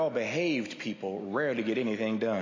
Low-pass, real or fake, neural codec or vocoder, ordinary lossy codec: 7.2 kHz; real; none; MP3, 32 kbps